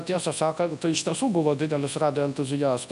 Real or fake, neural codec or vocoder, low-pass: fake; codec, 24 kHz, 0.9 kbps, WavTokenizer, large speech release; 10.8 kHz